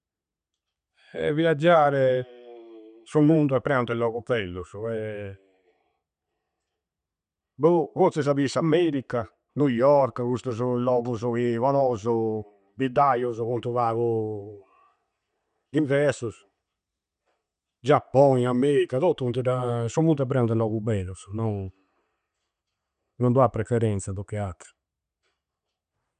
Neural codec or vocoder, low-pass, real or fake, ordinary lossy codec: none; 9.9 kHz; real; none